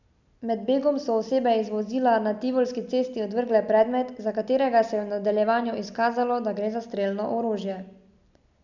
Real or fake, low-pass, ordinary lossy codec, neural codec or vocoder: real; 7.2 kHz; none; none